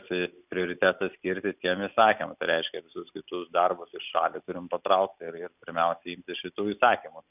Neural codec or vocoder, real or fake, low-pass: none; real; 3.6 kHz